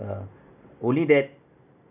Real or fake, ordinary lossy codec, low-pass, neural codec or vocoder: real; none; 3.6 kHz; none